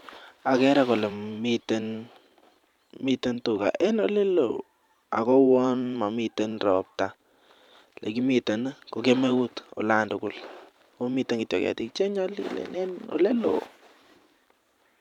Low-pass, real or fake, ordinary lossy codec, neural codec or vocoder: 19.8 kHz; fake; none; vocoder, 44.1 kHz, 128 mel bands every 512 samples, BigVGAN v2